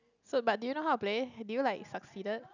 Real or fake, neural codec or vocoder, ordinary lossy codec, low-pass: real; none; none; 7.2 kHz